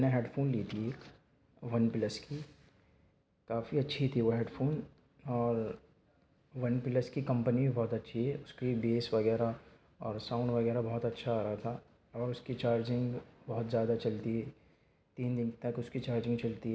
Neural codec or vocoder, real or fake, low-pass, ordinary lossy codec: none; real; none; none